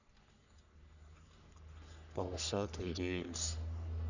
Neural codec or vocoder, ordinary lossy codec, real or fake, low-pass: codec, 44.1 kHz, 3.4 kbps, Pupu-Codec; none; fake; 7.2 kHz